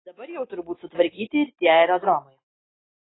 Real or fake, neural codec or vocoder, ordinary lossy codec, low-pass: real; none; AAC, 16 kbps; 7.2 kHz